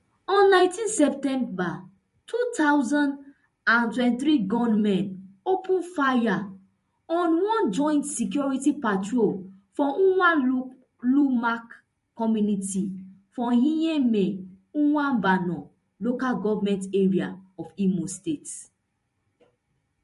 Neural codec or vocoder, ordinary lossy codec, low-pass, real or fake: vocoder, 44.1 kHz, 128 mel bands every 256 samples, BigVGAN v2; MP3, 48 kbps; 14.4 kHz; fake